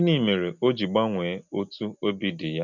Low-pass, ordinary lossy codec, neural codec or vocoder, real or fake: 7.2 kHz; none; none; real